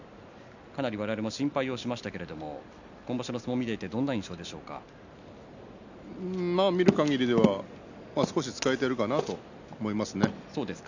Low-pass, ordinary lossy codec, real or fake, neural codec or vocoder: 7.2 kHz; none; real; none